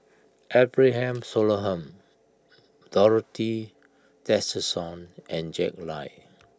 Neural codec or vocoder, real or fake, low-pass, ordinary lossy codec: none; real; none; none